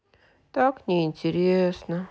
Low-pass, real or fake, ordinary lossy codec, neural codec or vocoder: none; real; none; none